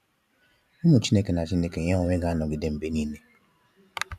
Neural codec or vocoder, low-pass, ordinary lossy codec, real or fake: vocoder, 44.1 kHz, 128 mel bands every 512 samples, BigVGAN v2; 14.4 kHz; none; fake